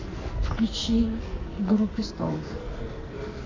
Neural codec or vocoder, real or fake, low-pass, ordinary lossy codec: codec, 44.1 kHz, 2.6 kbps, SNAC; fake; 7.2 kHz; AAC, 32 kbps